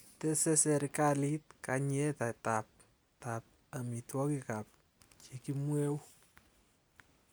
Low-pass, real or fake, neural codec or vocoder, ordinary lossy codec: none; real; none; none